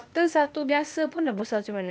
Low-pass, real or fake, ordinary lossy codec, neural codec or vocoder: none; fake; none; codec, 16 kHz, 0.8 kbps, ZipCodec